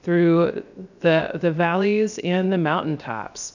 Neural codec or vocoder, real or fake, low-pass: codec, 16 kHz, 0.7 kbps, FocalCodec; fake; 7.2 kHz